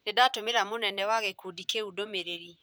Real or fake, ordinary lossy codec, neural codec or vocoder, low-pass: fake; none; vocoder, 44.1 kHz, 128 mel bands, Pupu-Vocoder; none